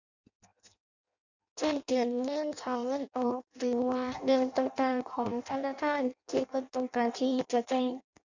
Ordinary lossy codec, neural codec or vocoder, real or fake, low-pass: none; codec, 16 kHz in and 24 kHz out, 0.6 kbps, FireRedTTS-2 codec; fake; 7.2 kHz